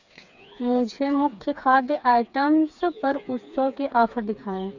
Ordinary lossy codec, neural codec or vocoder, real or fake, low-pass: none; codec, 16 kHz, 4 kbps, FreqCodec, smaller model; fake; 7.2 kHz